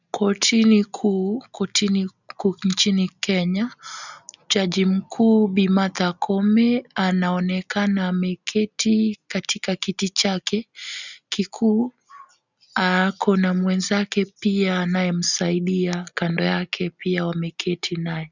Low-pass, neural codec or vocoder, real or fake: 7.2 kHz; none; real